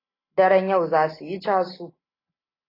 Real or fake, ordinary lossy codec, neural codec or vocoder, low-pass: real; AAC, 24 kbps; none; 5.4 kHz